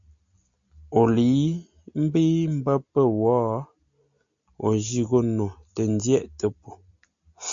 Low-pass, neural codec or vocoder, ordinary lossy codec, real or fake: 7.2 kHz; none; MP3, 48 kbps; real